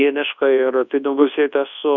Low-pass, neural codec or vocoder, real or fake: 7.2 kHz; codec, 24 kHz, 0.9 kbps, WavTokenizer, large speech release; fake